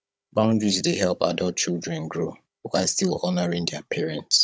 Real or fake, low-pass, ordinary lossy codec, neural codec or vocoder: fake; none; none; codec, 16 kHz, 16 kbps, FunCodec, trained on Chinese and English, 50 frames a second